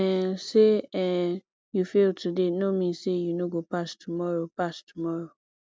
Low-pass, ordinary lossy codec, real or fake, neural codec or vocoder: none; none; real; none